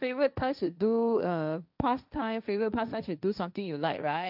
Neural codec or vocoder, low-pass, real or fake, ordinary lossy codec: codec, 16 kHz, 1.1 kbps, Voila-Tokenizer; 5.4 kHz; fake; none